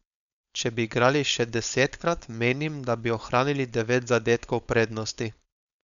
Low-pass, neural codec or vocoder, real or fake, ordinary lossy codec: 7.2 kHz; codec, 16 kHz, 4.8 kbps, FACodec; fake; none